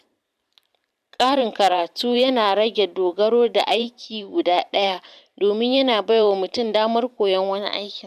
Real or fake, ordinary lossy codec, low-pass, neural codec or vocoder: fake; none; 14.4 kHz; vocoder, 44.1 kHz, 128 mel bands every 512 samples, BigVGAN v2